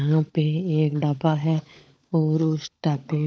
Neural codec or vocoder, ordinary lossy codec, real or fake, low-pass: codec, 16 kHz, 16 kbps, FunCodec, trained on LibriTTS, 50 frames a second; none; fake; none